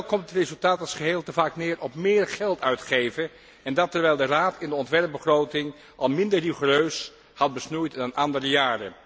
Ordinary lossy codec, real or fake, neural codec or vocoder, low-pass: none; real; none; none